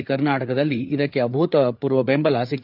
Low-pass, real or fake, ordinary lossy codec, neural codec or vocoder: 5.4 kHz; fake; none; codec, 16 kHz, 4 kbps, FreqCodec, larger model